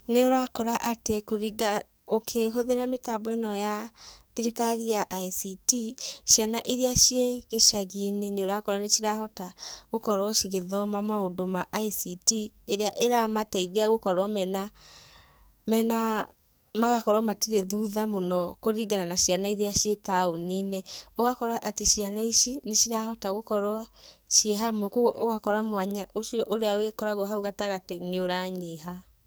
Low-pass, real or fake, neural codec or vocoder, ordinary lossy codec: none; fake; codec, 44.1 kHz, 2.6 kbps, SNAC; none